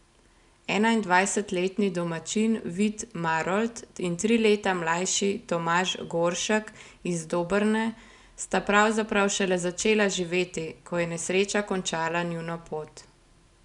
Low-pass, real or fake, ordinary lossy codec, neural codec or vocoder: 10.8 kHz; real; none; none